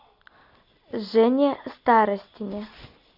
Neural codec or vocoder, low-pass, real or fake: none; 5.4 kHz; real